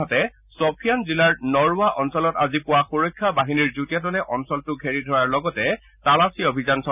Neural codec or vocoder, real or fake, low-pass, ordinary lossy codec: none; real; 3.6 kHz; none